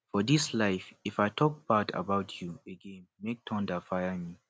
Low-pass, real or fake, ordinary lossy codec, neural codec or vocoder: none; real; none; none